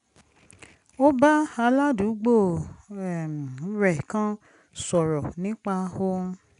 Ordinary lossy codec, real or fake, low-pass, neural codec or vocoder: none; real; 10.8 kHz; none